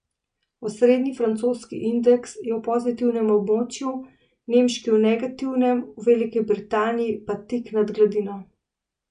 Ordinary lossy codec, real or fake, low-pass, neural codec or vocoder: none; real; 9.9 kHz; none